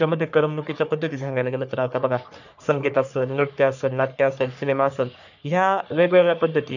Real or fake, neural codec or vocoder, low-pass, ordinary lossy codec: fake; codec, 44.1 kHz, 3.4 kbps, Pupu-Codec; 7.2 kHz; none